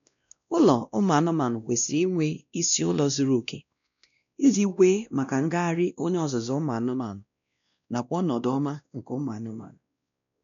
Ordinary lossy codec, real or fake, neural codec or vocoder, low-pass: none; fake; codec, 16 kHz, 1 kbps, X-Codec, WavLM features, trained on Multilingual LibriSpeech; 7.2 kHz